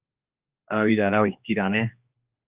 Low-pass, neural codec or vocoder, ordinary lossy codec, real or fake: 3.6 kHz; codec, 16 kHz, 2 kbps, X-Codec, HuBERT features, trained on general audio; Opus, 24 kbps; fake